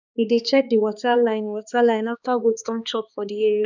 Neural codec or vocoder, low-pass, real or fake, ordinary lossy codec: codec, 16 kHz, 2 kbps, X-Codec, HuBERT features, trained on balanced general audio; 7.2 kHz; fake; none